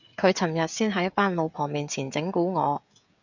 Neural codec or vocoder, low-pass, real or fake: codec, 16 kHz, 16 kbps, FreqCodec, smaller model; 7.2 kHz; fake